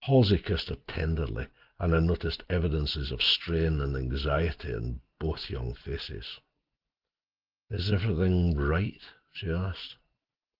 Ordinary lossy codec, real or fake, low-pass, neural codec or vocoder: Opus, 16 kbps; real; 5.4 kHz; none